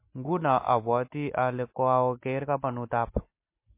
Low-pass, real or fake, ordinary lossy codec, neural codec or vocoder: 3.6 kHz; real; MP3, 24 kbps; none